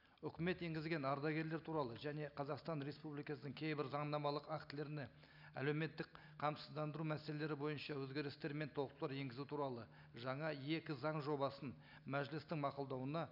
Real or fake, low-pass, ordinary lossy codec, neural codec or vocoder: real; 5.4 kHz; none; none